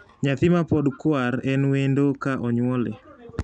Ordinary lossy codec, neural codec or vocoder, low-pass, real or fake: none; none; 9.9 kHz; real